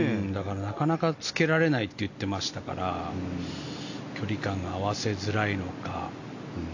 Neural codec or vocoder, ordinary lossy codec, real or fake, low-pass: none; AAC, 48 kbps; real; 7.2 kHz